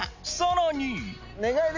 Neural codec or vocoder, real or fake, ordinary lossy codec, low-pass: none; real; Opus, 64 kbps; 7.2 kHz